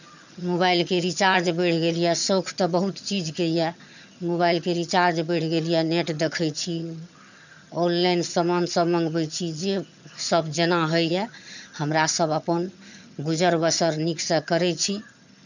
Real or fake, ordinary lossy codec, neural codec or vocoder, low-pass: fake; none; vocoder, 22.05 kHz, 80 mel bands, HiFi-GAN; 7.2 kHz